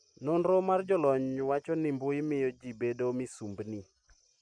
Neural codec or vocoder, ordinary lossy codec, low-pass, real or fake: none; none; 9.9 kHz; real